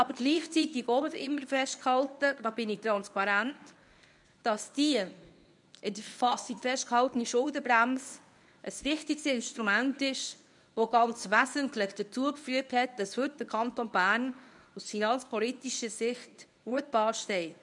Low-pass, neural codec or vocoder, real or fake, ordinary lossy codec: 10.8 kHz; codec, 24 kHz, 0.9 kbps, WavTokenizer, medium speech release version 1; fake; none